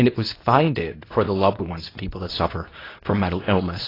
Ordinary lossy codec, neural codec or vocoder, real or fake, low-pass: AAC, 24 kbps; codec, 24 kHz, 0.9 kbps, WavTokenizer, medium speech release version 2; fake; 5.4 kHz